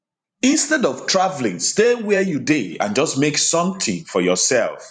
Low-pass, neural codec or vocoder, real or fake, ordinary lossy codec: 9.9 kHz; vocoder, 44.1 kHz, 128 mel bands every 512 samples, BigVGAN v2; fake; none